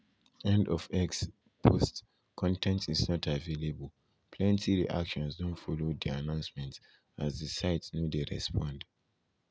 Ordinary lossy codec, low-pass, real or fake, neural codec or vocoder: none; none; real; none